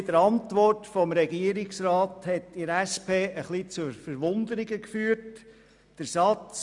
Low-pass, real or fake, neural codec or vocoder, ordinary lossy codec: 10.8 kHz; real; none; none